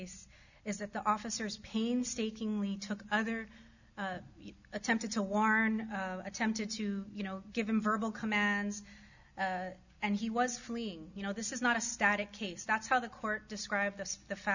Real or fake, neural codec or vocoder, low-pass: real; none; 7.2 kHz